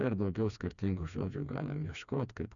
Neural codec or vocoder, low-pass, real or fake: codec, 16 kHz, 2 kbps, FreqCodec, smaller model; 7.2 kHz; fake